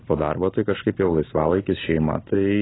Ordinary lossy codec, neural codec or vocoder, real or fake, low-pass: AAC, 16 kbps; none; real; 7.2 kHz